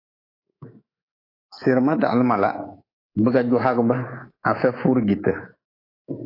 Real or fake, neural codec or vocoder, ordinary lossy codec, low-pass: fake; codec, 24 kHz, 3.1 kbps, DualCodec; AAC, 24 kbps; 5.4 kHz